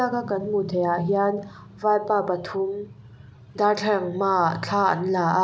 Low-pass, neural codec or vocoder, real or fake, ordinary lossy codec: none; none; real; none